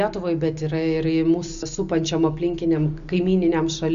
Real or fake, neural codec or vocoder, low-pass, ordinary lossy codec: real; none; 7.2 kHz; Opus, 64 kbps